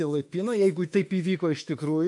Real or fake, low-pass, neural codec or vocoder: fake; 10.8 kHz; autoencoder, 48 kHz, 32 numbers a frame, DAC-VAE, trained on Japanese speech